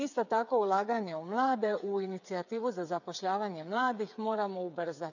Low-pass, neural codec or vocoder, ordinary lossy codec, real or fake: 7.2 kHz; codec, 16 kHz, 8 kbps, FreqCodec, smaller model; none; fake